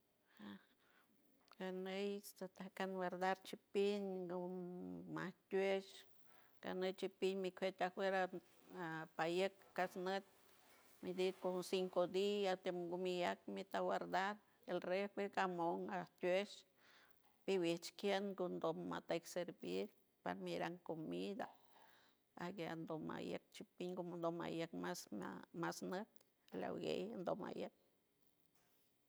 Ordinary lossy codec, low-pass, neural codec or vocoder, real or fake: none; none; none; real